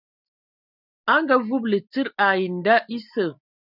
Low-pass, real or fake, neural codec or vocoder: 5.4 kHz; real; none